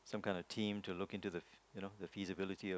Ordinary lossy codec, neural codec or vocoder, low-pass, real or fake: none; none; none; real